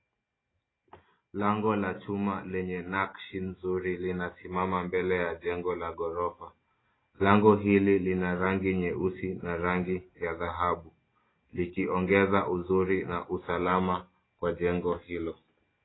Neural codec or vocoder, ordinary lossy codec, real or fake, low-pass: none; AAC, 16 kbps; real; 7.2 kHz